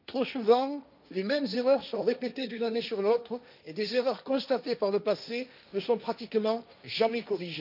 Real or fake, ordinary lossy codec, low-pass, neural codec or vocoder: fake; none; 5.4 kHz; codec, 16 kHz, 1.1 kbps, Voila-Tokenizer